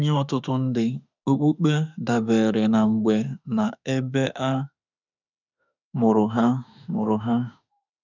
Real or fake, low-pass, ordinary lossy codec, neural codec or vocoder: fake; 7.2 kHz; none; autoencoder, 48 kHz, 32 numbers a frame, DAC-VAE, trained on Japanese speech